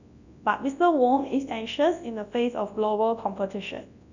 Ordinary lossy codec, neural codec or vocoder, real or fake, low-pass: none; codec, 24 kHz, 0.9 kbps, WavTokenizer, large speech release; fake; 7.2 kHz